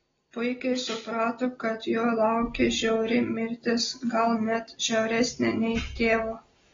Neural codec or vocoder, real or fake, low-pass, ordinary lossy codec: none; real; 7.2 kHz; AAC, 24 kbps